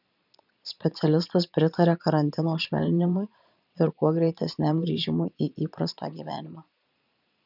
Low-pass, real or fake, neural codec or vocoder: 5.4 kHz; fake; vocoder, 22.05 kHz, 80 mel bands, Vocos